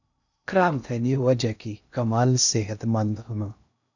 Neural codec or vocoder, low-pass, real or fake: codec, 16 kHz in and 24 kHz out, 0.6 kbps, FocalCodec, streaming, 2048 codes; 7.2 kHz; fake